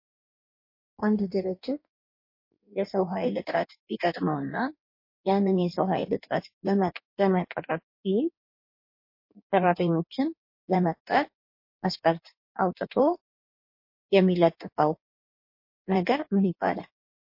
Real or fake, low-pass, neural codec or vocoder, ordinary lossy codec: fake; 5.4 kHz; codec, 16 kHz in and 24 kHz out, 1.1 kbps, FireRedTTS-2 codec; MP3, 32 kbps